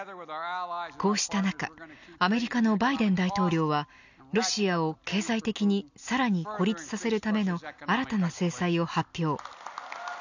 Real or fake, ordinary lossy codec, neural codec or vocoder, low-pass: real; none; none; 7.2 kHz